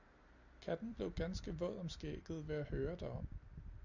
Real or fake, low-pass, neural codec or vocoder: real; 7.2 kHz; none